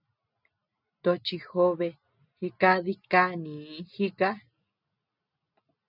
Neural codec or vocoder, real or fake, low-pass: none; real; 5.4 kHz